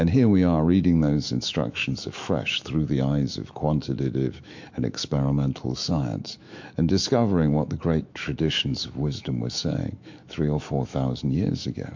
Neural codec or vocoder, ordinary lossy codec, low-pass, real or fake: autoencoder, 48 kHz, 128 numbers a frame, DAC-VAE, trained on Japanese speech; MP3, 48 kbps; 7.2 kHz; fake